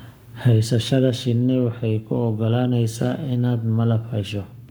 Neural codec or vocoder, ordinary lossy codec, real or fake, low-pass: codec, 44.1 kHz, 7.8 kbps, Pupu-Codec; none; fake; none